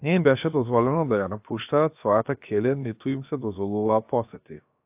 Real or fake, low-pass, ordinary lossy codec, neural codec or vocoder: fake; 3.6 kHz; AAC, 32 kbps; codec, 16 kHz, about 1 kbps, DyCAST, with the encoder's durations